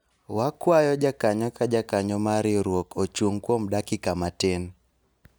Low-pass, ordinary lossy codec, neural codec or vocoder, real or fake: none; none; none; real